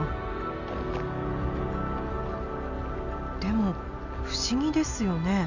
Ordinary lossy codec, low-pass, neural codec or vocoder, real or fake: none; 7.2 kHz; none; real